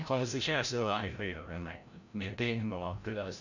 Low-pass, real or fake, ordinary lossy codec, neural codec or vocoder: 7.2 kHz; fake; none; codec, 16 kHz, 0.5 kbps, FreqCodec, larger model